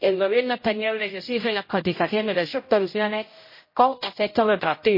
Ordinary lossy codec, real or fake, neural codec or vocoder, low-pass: MP3, 24 kbps; fake; codec, 16 kHz, 0.5 kbps, X-Codec, HuBERT features, trained on general audio; 5.4 kHz